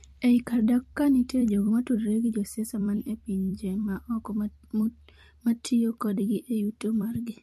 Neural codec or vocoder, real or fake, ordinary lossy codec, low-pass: vocoder, 44.1 kHz, 128 mel bands every 256 samples, BigVGAN v2; fake; MP3, 64 kbps; 14.4 kHz